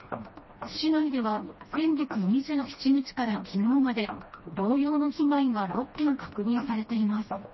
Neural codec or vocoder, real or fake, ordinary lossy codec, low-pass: codec, 16 kHz, 1 kbps, FreqCodec, smaller model; fake; MP3, 24 kbps; 7.2 kHz